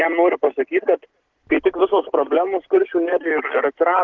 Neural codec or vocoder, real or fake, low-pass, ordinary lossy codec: none; real; 7.2 kHz; Opus, 16 kbps